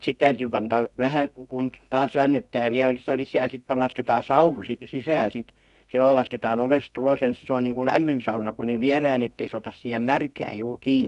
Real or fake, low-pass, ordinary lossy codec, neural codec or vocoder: fake; 10.8 kHz; none; codec, 24 kHz, 0.9 kbps, WavTokenizer, medium music audio release